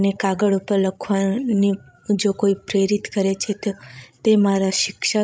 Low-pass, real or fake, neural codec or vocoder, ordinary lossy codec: none; fake; codec, 16 kHz, 16 kbps, FreqCodec, larger model; none